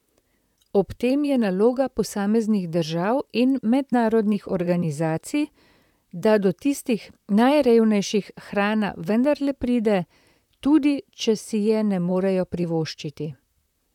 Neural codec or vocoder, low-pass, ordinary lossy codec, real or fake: vocoder, 44.1 kHz, 128 mel bands, Pupu-Vocoder; 19.8 kHz; none; fake